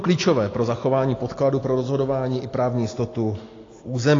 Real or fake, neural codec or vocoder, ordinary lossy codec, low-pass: real; none; AAC, 32 kbps; 7.2 kHz